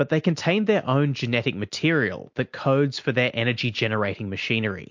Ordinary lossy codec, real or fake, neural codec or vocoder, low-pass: MP3, 48 kbps; real; none; 7.2 kHz